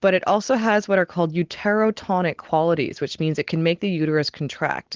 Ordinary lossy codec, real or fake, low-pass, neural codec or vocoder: Opus, 16 kbps; real; 7.2 kHz; none